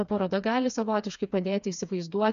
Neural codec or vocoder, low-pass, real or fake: codec, 16 kHz, 4 kbps, FreqCodec, smaller model; 7.2 kHz; fake